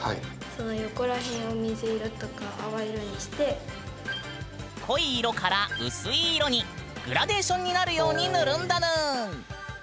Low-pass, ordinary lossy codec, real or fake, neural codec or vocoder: none; none; real; none